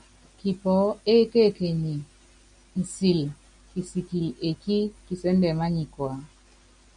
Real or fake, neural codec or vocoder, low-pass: real; none; 9.9 kHz